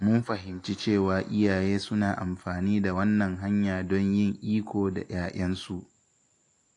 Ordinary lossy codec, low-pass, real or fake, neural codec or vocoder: AAC, 48 kbps; 10.8 kHz; real; none